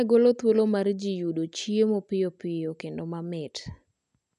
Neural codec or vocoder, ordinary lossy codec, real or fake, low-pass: none; none; real; 10.8 kHz